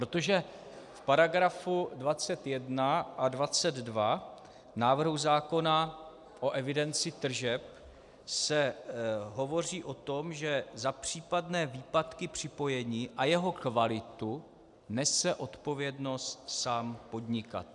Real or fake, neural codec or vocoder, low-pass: real; none; 10.8 kHz